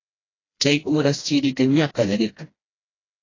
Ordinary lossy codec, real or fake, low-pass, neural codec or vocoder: AAC, 32 kbps; fake; 7.2 kHz; codec, 16 kHz, 1 kbps, FreqCodec, smaller model